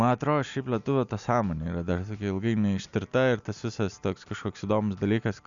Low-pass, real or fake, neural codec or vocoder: 7.2 kHz; real; none